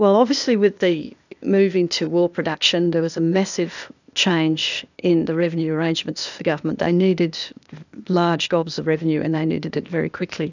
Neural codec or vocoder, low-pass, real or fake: codec, 16 kHz, 0.8 kbps, ZipCodec; 7.2 kHz; fake